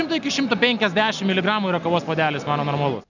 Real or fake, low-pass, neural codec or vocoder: real; 7.2 kHz; none